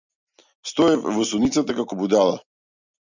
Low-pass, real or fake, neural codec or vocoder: 7.2 kHz; real; none